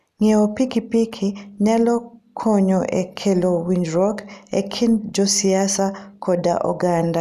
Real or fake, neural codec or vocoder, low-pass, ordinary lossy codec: real; none; 14.4 kHz; none